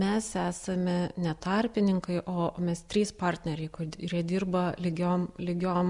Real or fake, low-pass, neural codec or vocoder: real; 10.8 kHz; none